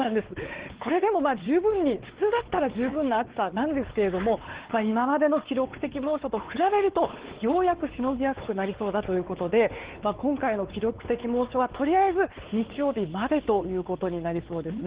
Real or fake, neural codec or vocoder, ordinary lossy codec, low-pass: fake; codec, 16 kHz, 4 kbps, X-Codec, WavLM features, trained on Multilingual LibriSpeech; Opus, 16 kbps; 3.6 kHz